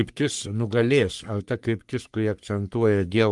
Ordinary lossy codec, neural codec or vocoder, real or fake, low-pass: Opus, 32 kbps; codec, 44.1 kHz, 3.4 kbps, Pupu-Codec; fake; 10.8 kHz